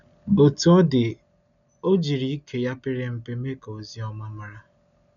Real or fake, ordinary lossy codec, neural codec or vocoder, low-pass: real; none; none; 7.2 kHz